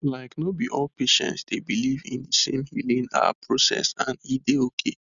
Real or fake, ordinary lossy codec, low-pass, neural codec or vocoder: real; none; 7.2 kHz; none